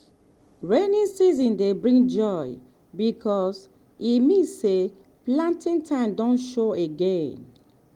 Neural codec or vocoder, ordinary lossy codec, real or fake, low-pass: none; Opus, 24 kbps; real; 19.8 kHz